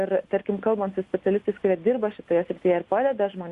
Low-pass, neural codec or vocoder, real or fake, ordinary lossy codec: 10.8 kHz; none; real; Opus, 64 kbps